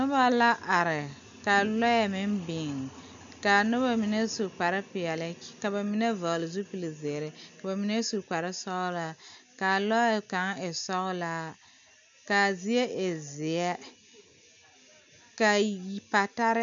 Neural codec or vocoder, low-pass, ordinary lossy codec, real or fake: none; 7.2 kHz; MP3, 64 kbps; real